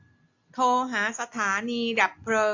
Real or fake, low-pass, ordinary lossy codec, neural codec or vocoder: real; 7.2 kHz; AAC, 48 kbps; none